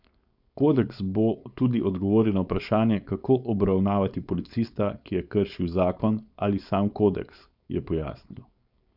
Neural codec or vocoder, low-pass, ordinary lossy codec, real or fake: codec, 16 kHz, 4.8 kbps, FACodec; 5.4 kHz; none; fake